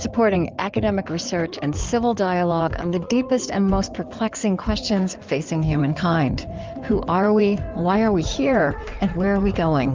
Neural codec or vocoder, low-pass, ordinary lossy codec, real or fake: codec, 16 kHz in and 24 kHz out, 2.2 kbps, FireRedTTS-2 codec; 7.2 kHz; Opus, 32 kbps; fake